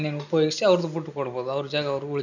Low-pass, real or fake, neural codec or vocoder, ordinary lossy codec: 7.2 kHz; real; none; none